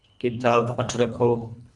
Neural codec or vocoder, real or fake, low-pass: codec, 24 kHz, 1.5 kbps, HILCodec; fake; 10.8 kHz